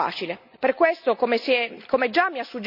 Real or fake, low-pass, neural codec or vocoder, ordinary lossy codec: real; 5.4 kHz; none; none